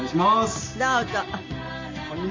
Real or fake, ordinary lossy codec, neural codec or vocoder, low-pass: real; MP3, 48 kbps; none; 7.2 kHz